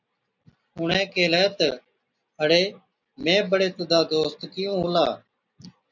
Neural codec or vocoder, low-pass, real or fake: none; 7.2 kHz; real